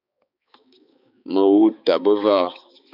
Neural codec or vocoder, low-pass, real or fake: codec, 16 kHz, 4 kbps, X-Codec, HuBERT features, trained on balanced general audio; 5.4 kHz; fake